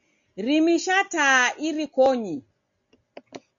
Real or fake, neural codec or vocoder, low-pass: real; none; 7.2 kHz